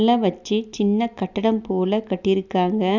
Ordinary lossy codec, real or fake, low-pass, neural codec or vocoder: none; real; 7.2 kHz; none